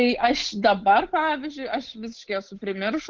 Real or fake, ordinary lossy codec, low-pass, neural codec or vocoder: fake; Opus, 16 kbps; 7.2 kHz; codec, 16 kHz, 8 kbps, FunCodec, trained on Chinese and English, 25 frames a second